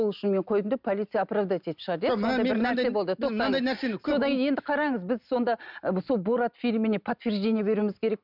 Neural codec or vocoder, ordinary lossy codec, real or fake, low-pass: none; none; real; 5.4 kHz